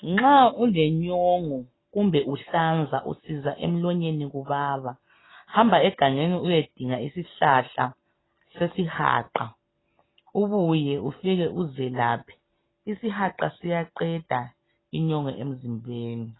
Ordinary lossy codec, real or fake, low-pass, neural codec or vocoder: AAC, 16 kbps; real; 7.2 kHz; none